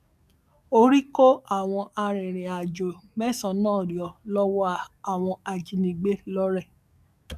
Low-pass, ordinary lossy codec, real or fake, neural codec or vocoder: 14.4 kHz; none; fake; codec, 44.1 kHz, 7.8 kbps, DAC